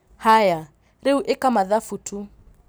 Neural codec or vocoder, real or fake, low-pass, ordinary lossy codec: none; real; none; none